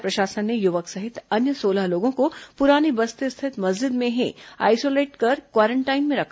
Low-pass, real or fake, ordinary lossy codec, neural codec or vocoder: none; real; none; none